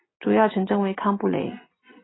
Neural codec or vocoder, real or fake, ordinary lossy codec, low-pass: none; real; AAC, 16 kbps; 7.2 kHz